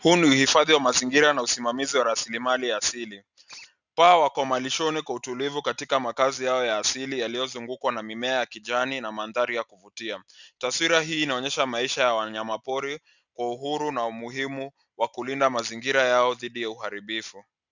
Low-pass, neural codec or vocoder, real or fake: 7.2 kHz; none; real